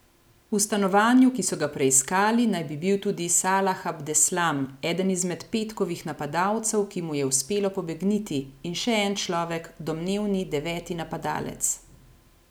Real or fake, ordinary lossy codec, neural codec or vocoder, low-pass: real; none; none; none